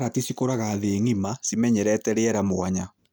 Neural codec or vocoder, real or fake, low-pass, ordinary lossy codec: none; real; none; none